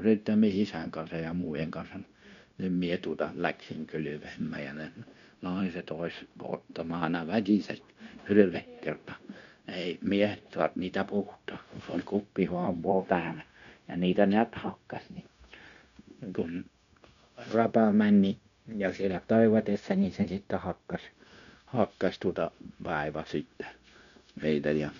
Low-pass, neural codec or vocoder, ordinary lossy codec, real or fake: 7.2 kHz; codec, 16 kHz, 0.9 kbps, LongCat-Audio-Codec; none; fake